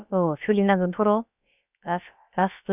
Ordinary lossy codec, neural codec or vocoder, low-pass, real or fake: none; codec, 16 kHz, about 1 kbps, DyCAST, with the encoder's durations; 3.6 kHz; fake